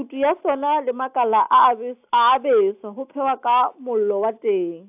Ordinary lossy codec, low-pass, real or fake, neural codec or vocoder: AAC, 32 kbps; 3.6 kHz; real; none